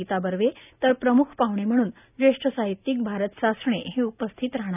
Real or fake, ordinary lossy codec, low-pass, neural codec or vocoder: real; none; 3.6 kHz; none